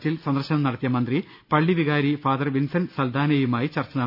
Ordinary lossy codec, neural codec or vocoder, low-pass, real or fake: none; none; 5.4 kHz; real